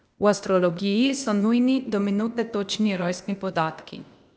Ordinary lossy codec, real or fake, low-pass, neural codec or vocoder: none; fake; none; codec, 16 kHz, 0.8 kbps, ZipCodec